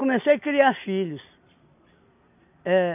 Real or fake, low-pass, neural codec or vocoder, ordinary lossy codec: real; 3.6 kHz; none; none